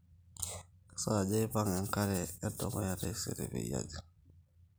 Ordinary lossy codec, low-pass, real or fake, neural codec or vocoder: none; none; real; none